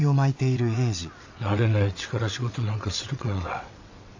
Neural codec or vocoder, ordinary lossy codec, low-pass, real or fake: vocoder, 22.05 kHz, 80 mel bands, WaveNeXt; AAC, 48 kbps; 7.2 kHz; fake